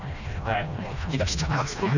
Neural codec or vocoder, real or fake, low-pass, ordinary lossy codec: codec, 16 kHz, 1 kbps, FreqCodec, smaller model; fake; 7.2 kHz; none